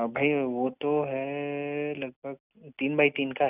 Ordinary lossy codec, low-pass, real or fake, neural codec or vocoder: none; 3.6 kHz; real; none